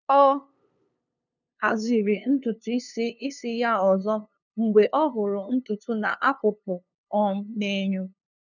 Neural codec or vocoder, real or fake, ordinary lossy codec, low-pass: codec, 16 kHz, 2 kbps, FunCodec, trained on LibriTTS, 25 frames a second; fake; none; 7.2 kHz